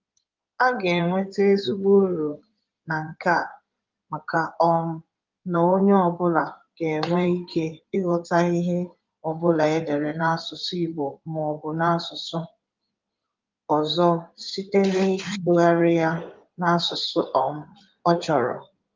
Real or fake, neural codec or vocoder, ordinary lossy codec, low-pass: fake; codec, 16 kHz in and 24 kHz out, 2.2 kbps, FireRedTTS-2 codec; Opus, 24 kbps; 7.2 kHz